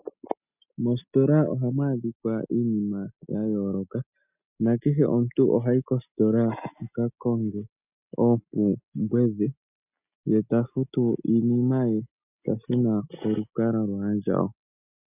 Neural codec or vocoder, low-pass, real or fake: none; 3.6 kHz; real